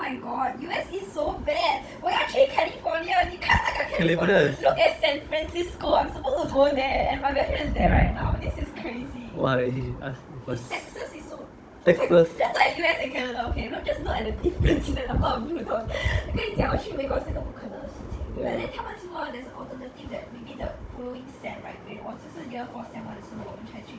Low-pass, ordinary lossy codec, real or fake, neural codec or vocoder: none; none; fake; codec, 16 kHz, 16 kbps, FunCodec, trained on Chinese and English, 50 frames a second